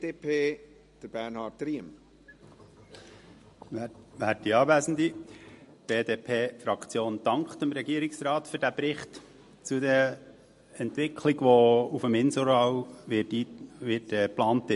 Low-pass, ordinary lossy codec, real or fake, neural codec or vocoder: 14.4 kHz; MP3, 48 kbps; real; none